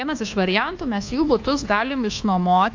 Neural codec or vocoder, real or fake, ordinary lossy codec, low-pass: codec, 24 kHz, 1.2 kbps, DualCodec; fake; AAC, 48 kbps; 7.2 kHz